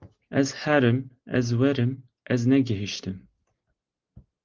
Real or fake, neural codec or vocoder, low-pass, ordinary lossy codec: real; none; 7.2 kHz; Opus, 16 kbps